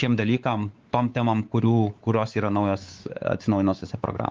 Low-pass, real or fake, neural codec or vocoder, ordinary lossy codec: 7.2 kHz; fake; codec, 16 kHz, 4 kbps, X-Codec, WavLM features, trained on Multilingual LibriSpeech; Opus, 32 kbps